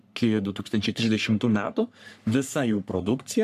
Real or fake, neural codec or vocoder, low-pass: fake; codec, 44.1 kHz, 3.4 kbps, Pupu-Codec; 14.4 kHz